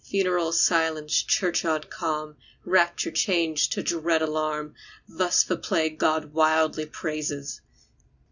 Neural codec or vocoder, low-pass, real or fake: none; 7.2 kHz; real